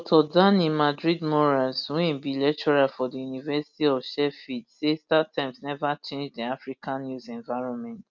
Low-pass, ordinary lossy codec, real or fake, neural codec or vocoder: 7.2 kHz; none; real; none